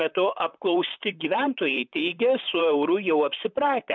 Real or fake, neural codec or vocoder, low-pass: fake; vocoder, 44.1 kHz, 128 mel bands, Pupu-Vocoder; 7.2 kHz